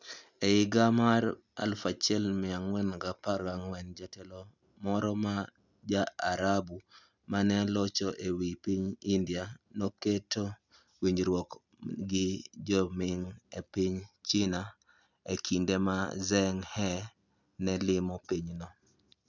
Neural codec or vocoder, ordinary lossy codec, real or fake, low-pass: none; none; real; 7.2 kHz